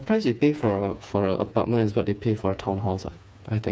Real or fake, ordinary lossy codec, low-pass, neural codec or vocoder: fake; none; none; codec, 16 kHz, 4 kbps, FreqCodec, smaller model